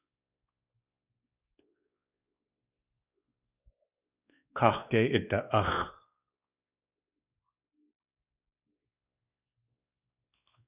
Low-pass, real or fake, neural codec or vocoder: 3.6 kHz; fake; codec, 16 kHz, 2 kbps, X-Codec, WavLM features, trained on Multilingual LibriSpeech